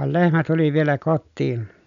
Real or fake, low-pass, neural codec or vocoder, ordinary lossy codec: real; 7.2 kHz; none; none